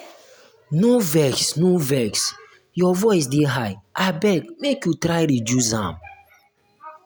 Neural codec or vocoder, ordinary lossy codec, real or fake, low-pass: none; none; real; none